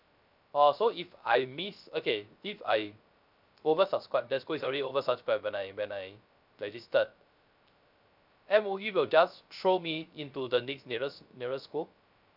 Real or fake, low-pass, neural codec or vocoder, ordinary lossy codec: fake; 5.4 kHz; codec, 16 kHz, 0.3 kbps, FocalCodec; none